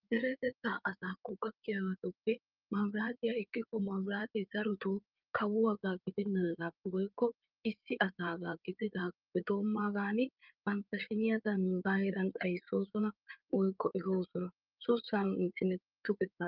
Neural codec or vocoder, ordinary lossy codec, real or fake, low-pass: codec, 16 kHz in and 24 kHz out, 2.2 kbps, FireRedTTS-2 codec; Opus, 24 kbps; fake; 5.4 kHz